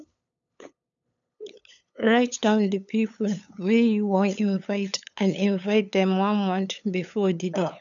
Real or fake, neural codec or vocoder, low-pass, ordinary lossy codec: fake; codec, 16 kHz, 8 kbps, FunCodec, trained on LibriTTS, 25 frames a second; 7.2 kHz; none